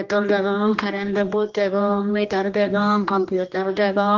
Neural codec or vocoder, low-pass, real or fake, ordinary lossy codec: codec, 16 kHz, 1 kbps, X-Codec, HuBERT features, trained on general audio; 7.2 kHz; fake; Opus, 32 kbps